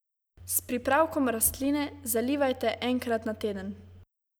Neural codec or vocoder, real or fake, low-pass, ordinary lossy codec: none; real; none; none